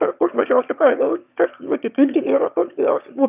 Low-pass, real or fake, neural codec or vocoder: 3.6 kHz; fake; autoencoder, 22.05 kHz, a latent of 192 numbers a frame, VITS, trained on one speaker